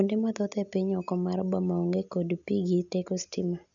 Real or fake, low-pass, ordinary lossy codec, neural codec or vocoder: real; 7.2 kHz; none; none